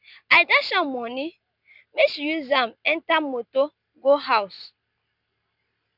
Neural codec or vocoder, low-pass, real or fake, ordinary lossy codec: none; 5.4 kHz; real; none